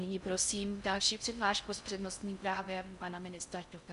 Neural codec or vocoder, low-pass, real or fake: codec, 16 kHz in and 24 kHz out, 0.6 kbps, FocalCodec, streaming, 4096 codes; 10.8 kHz; fake